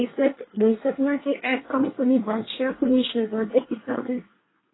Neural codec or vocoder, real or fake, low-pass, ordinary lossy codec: codec, 24 kHz, 1 kbps, SNAC; fake; 7.2 kHz; AAC, 16 kbps